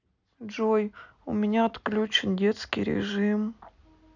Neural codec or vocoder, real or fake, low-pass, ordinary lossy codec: none; real; 7.2 kHz; none